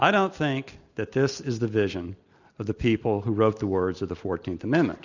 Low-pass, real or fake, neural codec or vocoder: 7.2 kHz; real; none